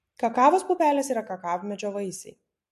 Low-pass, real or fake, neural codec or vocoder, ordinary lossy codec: 14.4 kHz; real; none; MP3, 64 kbps